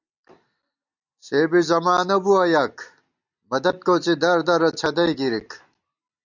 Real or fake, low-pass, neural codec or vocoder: real; 7.2 kHz; none